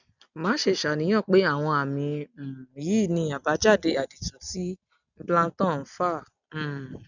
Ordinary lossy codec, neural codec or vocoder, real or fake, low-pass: none; none; real; 7.2 kHz